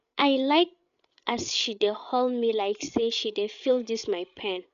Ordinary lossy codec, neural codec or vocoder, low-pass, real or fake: none; codec, 16 kHz, 8 kbps, FreqCodec, larger model; 7.2 kHz; fake